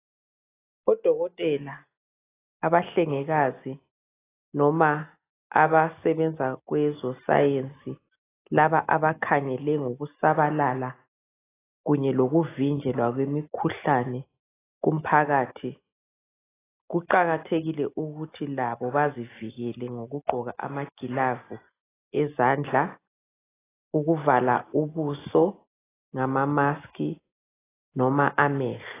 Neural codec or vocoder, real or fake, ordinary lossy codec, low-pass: none; real; AAC, 16 kbps; 3.6 kHz